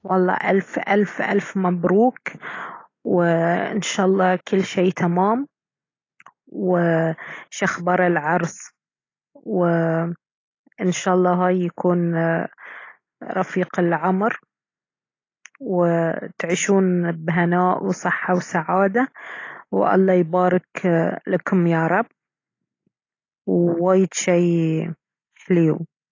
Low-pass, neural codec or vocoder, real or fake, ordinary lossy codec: 7.2 kHz; none; real; AAC, 32 kbps